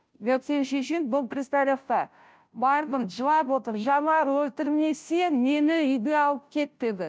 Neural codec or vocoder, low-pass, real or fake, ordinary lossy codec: codec, 16 kHz, 0.5 kbps, FunCodec, trained on Chinese and English, 25 frames a second; none; fake; none